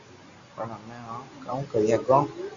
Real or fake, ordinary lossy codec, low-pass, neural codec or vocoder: real; Opus, 64 kbps; 7.2 kHz; none